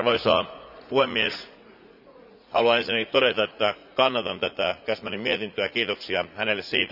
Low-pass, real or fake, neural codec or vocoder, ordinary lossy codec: 5.4 kHz; fake; vocoder, 44.1 kHz, 80 mel bands, Vocos; none